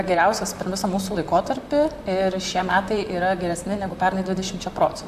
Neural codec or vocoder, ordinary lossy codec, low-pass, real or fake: vocoder, 44.1 kHz, 128 mel bands, Pupu-Vocoder; AAC, 96 kbps; 14.4 kHz; fake